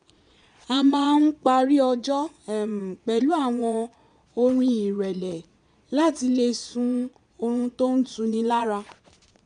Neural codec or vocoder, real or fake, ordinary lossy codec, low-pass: vocoder, 22.05 kHz, 80 mel bands, Vocos; fake; none; 9.9 kHz